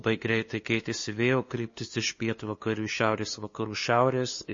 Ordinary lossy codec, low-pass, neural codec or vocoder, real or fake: MP3, 32 kbps; 7.2 kHz; codec, 16 kHz, 2 kbps, FunCodec, trained on LibriTTS, 25 frames a second; fake